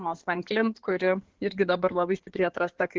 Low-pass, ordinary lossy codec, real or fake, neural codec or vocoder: 7.2 kHz; Opus, 16 kbps; fake; codec, 16 kHz in and 24 kHz out, 2.2 kbps, FireRedTTS-2 codec